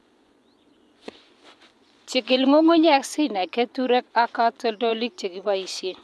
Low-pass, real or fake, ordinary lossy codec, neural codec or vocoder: none; fake; none; vocoder, 24 kHz, 100 mel bands, Vocos